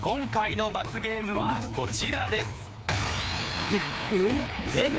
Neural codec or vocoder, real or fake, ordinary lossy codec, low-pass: codec, 16 kHz, 2 kbps, FreqCodec, larger model; fake; none; none